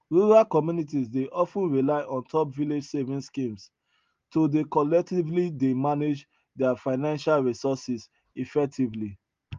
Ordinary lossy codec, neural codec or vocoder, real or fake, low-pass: Opus, 32 kbps; none; real; 7.2 kHz